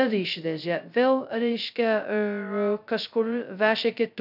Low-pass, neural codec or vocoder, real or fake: 5.4 kHz; codec, 16 kHz, 0.2 kbps, FocalCodec; fake